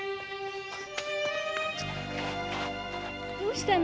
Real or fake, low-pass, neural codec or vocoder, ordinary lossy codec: real; none; none; none